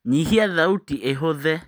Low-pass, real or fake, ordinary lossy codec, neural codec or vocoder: none; real; none; none